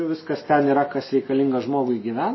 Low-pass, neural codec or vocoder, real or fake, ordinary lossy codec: 7.2 kHz; none; real; MP3, 24 kbps